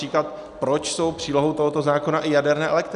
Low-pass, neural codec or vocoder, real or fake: 10.8 kHz; none; real